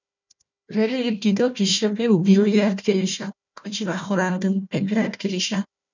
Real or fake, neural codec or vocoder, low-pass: fake; codec, 16 kHz, 1 kbps, FunCodec, trained on Chinese and English, 50 frames a second; 7.2 kHz